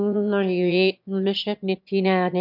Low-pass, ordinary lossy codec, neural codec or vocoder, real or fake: 5.4 kHz; none; autoencoder, 22.05 kHz, a latent of 192 numbers a frame, VITS, trained on one speaker; fake